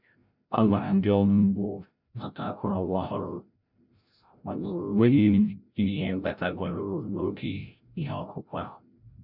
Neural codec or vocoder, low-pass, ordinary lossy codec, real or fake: codec, 16 kHz, 0.5 kbps, FreqCodec, larger model; 5.4 kHz; none; fake